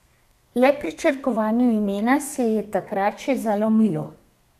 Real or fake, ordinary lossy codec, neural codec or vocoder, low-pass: fake; none; codec, 32 kHz, 1.9 kbps, SNAC; 14.4 kHz